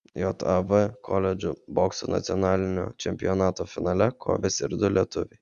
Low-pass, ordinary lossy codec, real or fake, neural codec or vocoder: 9.9 kHz; Opus, 64 kbps; real; none